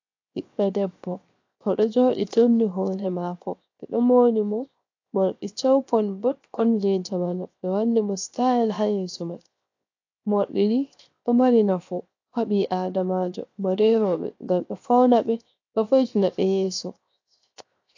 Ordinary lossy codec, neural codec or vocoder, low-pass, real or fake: AAC, 48 kbps; codec, 16 kHz, 0.7 kbps, FocalCodec; 7.2 kHz; fake